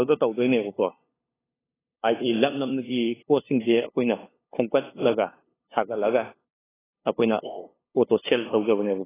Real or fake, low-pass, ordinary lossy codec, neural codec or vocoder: fake; 3.6 kHz; AAC, 16 kbps; codec, 16 kHz, 2 kbps, FunCodec, trained on LibriTTS, 25 frames a second